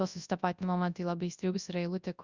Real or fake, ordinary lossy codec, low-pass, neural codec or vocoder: fake; Opus, 64 kbps; 7.2 kHz; codec, 24 kHz, 0.9 kbps, WavTokenizer, large speech release